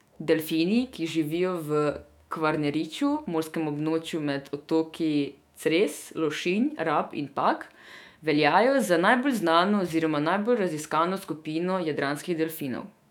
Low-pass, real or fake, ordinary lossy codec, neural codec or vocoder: 19.8 kHz; fake; none; autoencoder, 48 kHz, 128 numbers a frame, DAC-VAE, trained on Japanese speech